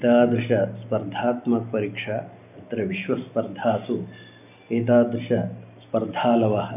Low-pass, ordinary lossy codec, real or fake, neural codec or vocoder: 3.6 kHz; none; real; none